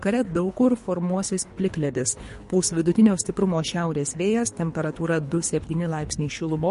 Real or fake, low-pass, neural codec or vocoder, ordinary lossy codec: fake; 10.8 kHz; codec, 24 kHz, 3 kbps, HILCodec; MP3, 48 kbps